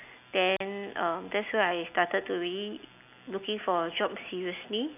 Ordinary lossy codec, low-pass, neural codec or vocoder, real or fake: none; 3.6 kHz; none; real